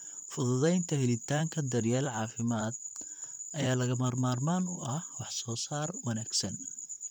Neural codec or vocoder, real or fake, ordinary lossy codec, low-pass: vocoder, 44.1 kHz, 128 mel bands, Pupu-Vocoder; fake; none; 19.8 kHz